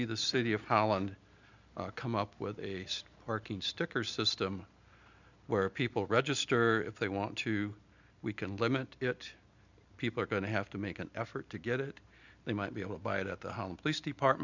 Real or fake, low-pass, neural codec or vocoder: real; 7.2 kHz; none